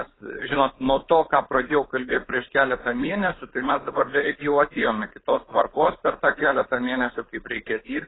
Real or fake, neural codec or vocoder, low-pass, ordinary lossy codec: fake; codec, 16 kHz, 4.8 kbps, FACodec; 7.2 kHz; AAC, 16 kbps